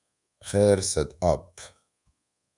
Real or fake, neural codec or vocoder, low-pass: fake; codec, 24 kHz, 1.2 kbps, DualCodec; 10.8 kHz